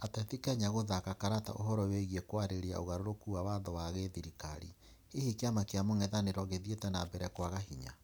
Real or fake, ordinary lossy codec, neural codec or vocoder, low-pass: real; none; none; none